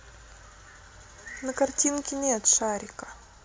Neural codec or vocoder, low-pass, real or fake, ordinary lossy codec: none; none; real; none